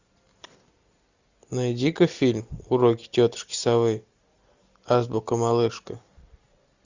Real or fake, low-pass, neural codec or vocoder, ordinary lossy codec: real; 7.2 kHz; none; Opus, 64 kbps